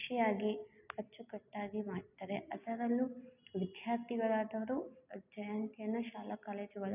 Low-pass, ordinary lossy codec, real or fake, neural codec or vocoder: 3.6 kHz; none; real; none